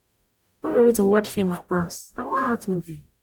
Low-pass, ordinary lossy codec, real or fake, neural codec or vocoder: 19.8 kHz; none; fake; codec, 44.1 kHz, 0.9 kbps, DAC